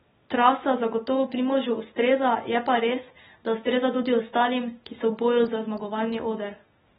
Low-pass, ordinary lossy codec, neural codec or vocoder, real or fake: 14.4 kHz; AAC, 16 kbps; none; real